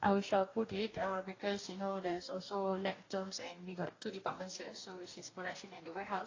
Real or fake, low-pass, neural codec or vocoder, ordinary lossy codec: fake; 7.2 kHz; codec, 44.1 kHz, 2.6 kbps, DAC; AAC, 32 kbps